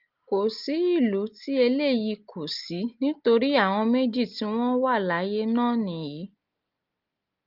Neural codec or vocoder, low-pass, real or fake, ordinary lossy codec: none; 5.4 kHz; real; Opus, 32 kbps